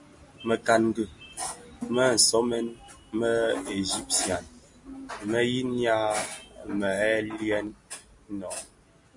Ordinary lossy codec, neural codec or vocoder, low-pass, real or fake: MP3, 48 kbps; none; 10.8 kHz; real